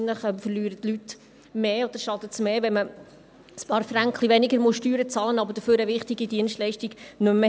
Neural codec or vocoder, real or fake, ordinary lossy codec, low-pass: none; real; none; none